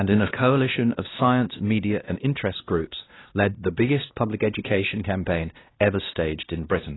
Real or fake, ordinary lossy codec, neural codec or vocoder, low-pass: fake; AAC, 16 kbps; codec, 16 kHz, 1 kbps, X-Codec, WavLM features, trained on Multilingual LibriSpeech; 7.2 kHz